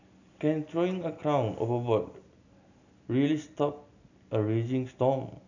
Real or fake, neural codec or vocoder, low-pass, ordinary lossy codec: real; none; 7.2 kHz; none